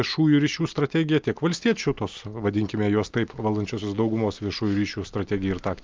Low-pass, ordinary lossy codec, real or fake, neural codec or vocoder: 7.2 kHz; Opus, 32 kbps; real; none